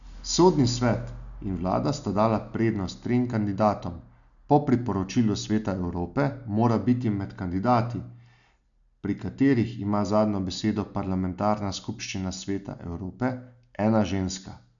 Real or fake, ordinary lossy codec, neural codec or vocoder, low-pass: real; none; none; 7.2 kHz